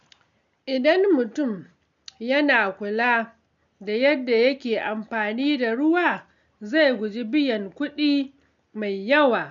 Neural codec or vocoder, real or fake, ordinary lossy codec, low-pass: none; real; none; 7.2 kHz